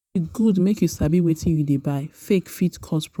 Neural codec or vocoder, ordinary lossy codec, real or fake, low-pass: vocoder, 48 kHz, 128 mel bands, Vocos; none; fake; 19.8 kHz